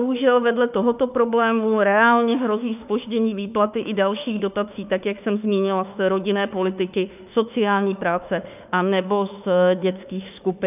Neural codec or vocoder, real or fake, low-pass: autoencoder, 48 kHz, 32 numbers a frame, DAC-VAE, trained on Japanese speech; fake; 3.6 kHz